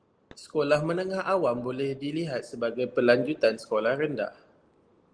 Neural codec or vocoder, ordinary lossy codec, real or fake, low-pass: none; Opus, 24 kbps; real; 9.9 kHz